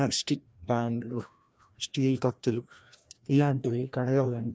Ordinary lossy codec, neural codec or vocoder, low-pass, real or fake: none; codec, 16 kHz, 1 kbps, FreqCodec, larger model; none; fake